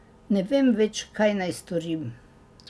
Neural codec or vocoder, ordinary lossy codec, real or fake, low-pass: none; none; real; none